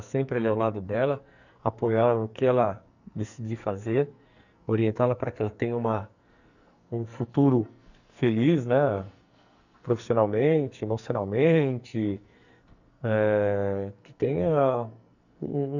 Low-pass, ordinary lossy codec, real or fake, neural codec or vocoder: 7.2 kHz; none; fake; codec, 44.1 kHz, 2.6 kbps, SNAC